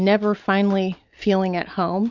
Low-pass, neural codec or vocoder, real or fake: 7.2 kHz; none; real